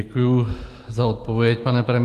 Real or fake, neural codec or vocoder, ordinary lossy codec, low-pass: real; none; Opus, 24 kbps; 14.4 kHz